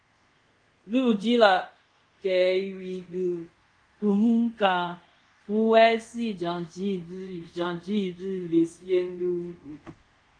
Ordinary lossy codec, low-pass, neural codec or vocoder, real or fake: Opus, 24 kbps; 9.9 kHz; codec, 24 kHz, 0.5 kbps, DualCodec; fake